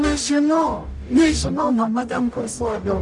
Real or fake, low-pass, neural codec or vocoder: fake; 10.8 kHz; codec, 44.1 kHz, 0.9 kbps, DAC